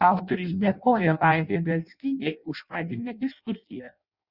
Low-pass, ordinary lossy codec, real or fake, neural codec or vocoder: 5.4 kHz; Opus, 64 kbps; fake; codec, 16 kHz in and 24 kHz out, 0.6 kbps, FireRedTTS-2 codec